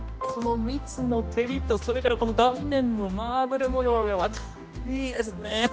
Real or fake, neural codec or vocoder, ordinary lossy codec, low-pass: fake; codec, 16 kHz, 1 kbps, X-Codec, HuBERT features, trained on general audio; none; none